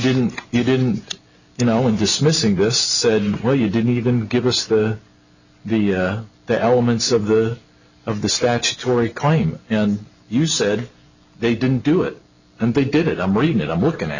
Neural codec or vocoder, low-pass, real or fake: none; 7.2 kHz; real